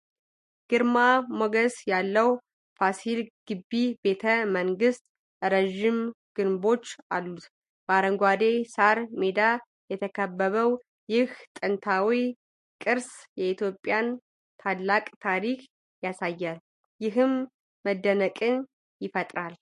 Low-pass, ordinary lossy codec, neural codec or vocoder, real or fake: 14.4 kHz; MP3, 48 kbps; none; real